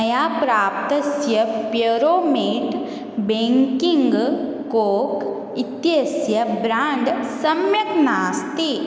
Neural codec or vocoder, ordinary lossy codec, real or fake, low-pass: none; none; real; none